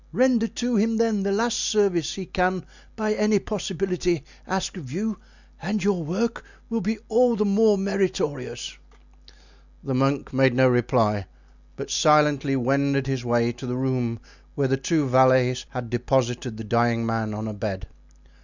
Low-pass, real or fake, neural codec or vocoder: 7.2 kHz; real; none